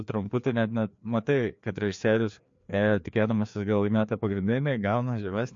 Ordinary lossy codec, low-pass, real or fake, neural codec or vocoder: MP3, 48 kbps; 7.2 kHz; fake; codec, 16 kHz, 2 kbps, FreqCodec, larger model